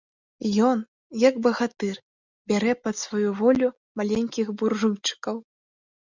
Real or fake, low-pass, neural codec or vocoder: real; 7.2 kHz; none